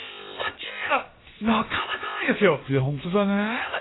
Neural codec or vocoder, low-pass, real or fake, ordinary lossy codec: codec, 16 kHz, about 1 kbps, DyCAST, with the encoder's durations; 7.2 kHz; fake; AAC, 16 kbps